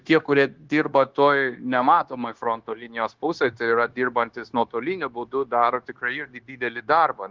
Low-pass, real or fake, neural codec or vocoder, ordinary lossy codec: 7.2 kHz; fake; codec, 16 kHz, 0.9 kbps, LongCat-Audio-Codec; Opus, 16 kbps